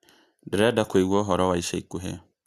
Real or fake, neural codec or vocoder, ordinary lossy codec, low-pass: real; none; Opus, 64 kbps; 14.4 kHz